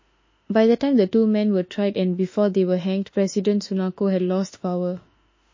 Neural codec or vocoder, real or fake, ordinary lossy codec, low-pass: autoencoder, 48 kHz, 32 numbers a frame, DAC-VAE, trained on Japanese speech; fake; MP3, 32 kbps; 7.2 kHz